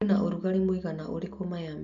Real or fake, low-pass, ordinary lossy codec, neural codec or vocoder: real; 7.2 kHz; none; none